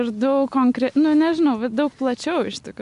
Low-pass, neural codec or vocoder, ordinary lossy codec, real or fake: 10.8 kHz; none; MP3, 64 kbps; real